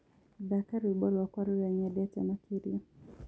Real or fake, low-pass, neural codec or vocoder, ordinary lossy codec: real; none; none; none